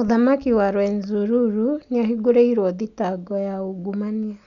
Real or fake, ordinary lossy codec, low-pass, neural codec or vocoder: real; none; 7.2 kHz; none